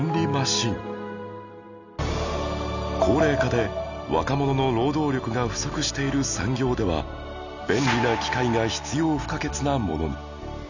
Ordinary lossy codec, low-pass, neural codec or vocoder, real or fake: none; 7.2 kHz; none; real